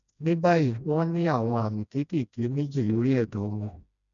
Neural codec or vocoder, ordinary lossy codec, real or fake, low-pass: codec, 16 kHz, 1 kbps, FreqCodec, smaller model; none; fake; 7.2 kHz